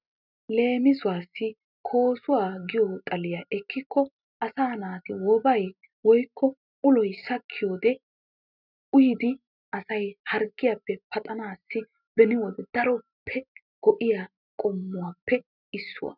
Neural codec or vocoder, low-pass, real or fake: none; 5.4 kHz; real